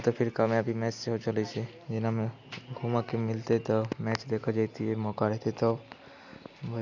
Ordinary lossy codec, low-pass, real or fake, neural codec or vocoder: none; 7.2 kHz; real; none